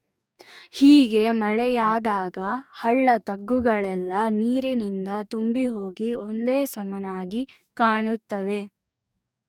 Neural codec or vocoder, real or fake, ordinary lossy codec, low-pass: codec, 44.1 kHz, 2.6 kbps, DAC; fake; none; 19.8 kHz